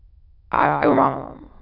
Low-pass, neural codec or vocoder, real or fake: 5.4 kHz; autoencoder, 22.05 kHz, a latent of 192 numbers a frame, VITS, trained on many speakers; fake